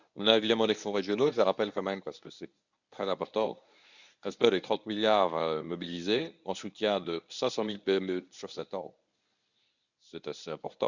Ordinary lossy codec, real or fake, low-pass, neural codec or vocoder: none; fake; 7.2 kHz; codec, 24 kHz, 0.9 kbps, WavTokenizer, medium speech release version 1